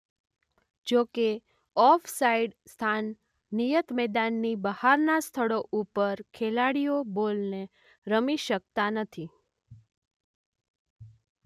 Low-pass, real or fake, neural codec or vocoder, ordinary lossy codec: 14.4 kHz; real; none; none